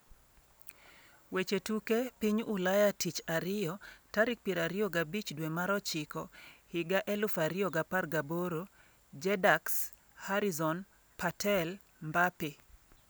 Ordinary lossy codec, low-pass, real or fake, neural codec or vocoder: none; none; real; none